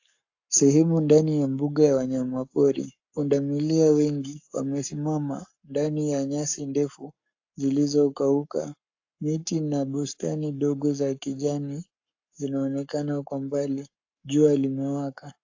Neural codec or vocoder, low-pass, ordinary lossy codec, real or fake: codec, 44.1 kHz, 7.8 kbps, Pupu-Codec; 7.2 kHz; AAC, 48 kbps; fake